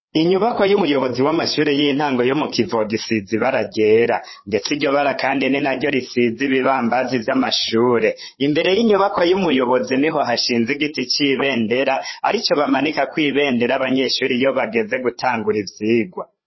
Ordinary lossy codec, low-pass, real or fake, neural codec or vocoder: MP3, 24 kbps; 7.2 kHz; fake; codec, 16 kHz, 4 kbps, FreqCodec, larger model